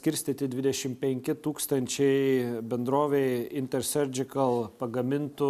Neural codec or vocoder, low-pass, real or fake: none; 14.4 kHz; real